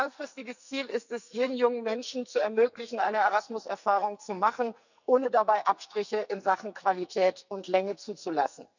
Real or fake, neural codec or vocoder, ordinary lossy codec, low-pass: fake; codec, 44.1 kHz, 2.6 kbps, SNAC; none; 7.2 kHz